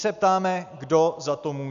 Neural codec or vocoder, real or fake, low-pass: none; real; 7.2 kHz